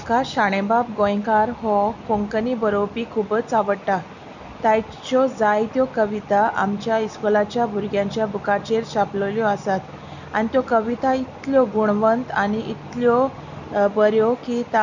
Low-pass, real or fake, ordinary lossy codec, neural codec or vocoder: 7.2 kHz; real; none; none